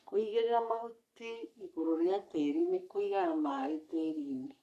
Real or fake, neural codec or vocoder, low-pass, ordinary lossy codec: fake; codec, 44.1 kHz, 3.4 kbps, Pupu-Codec; 14.4 kHz; none